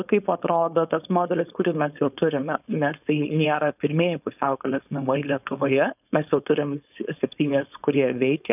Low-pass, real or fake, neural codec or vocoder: 3.6 kHz; fake; codec, 16 kHz, 4.8 kbps, FACodec